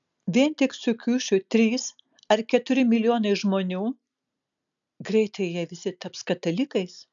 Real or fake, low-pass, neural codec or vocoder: real; 7.2 kHz; none